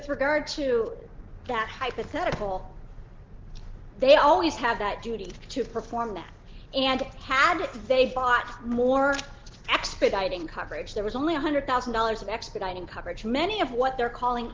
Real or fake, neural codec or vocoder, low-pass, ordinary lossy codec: real; none; 7.2 kHz; Opus, 16 kbps